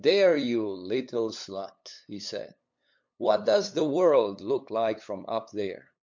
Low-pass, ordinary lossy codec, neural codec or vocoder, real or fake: 7.2 kHz; MP3, 64 kbps; codec, 16 kHz, 8 kbps, FunCodec, trained on LibriTTS, 25 frames a second; fake